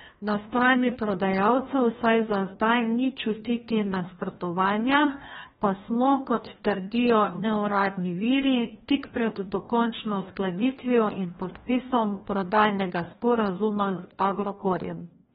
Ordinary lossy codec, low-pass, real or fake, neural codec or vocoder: AAC, 16 kbps; 7.2 kHz; fake; codec, 16 kHz, 1 kbps, FreqCodec, larger model